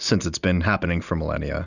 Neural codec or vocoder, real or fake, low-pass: none; real; 7.2 kHz